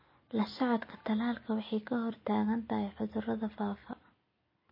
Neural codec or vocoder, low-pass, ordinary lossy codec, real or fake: none; 5.4 kHz; MP3, 24 kbps; real